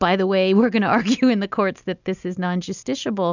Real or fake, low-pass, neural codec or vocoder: real; 7.2 kHz; none